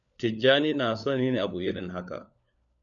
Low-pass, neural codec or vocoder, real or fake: 7.2 kHz; codec, 16 kHz, 4 kbps, FunCodec, trained on LibriTTS, 50 frames a second; fake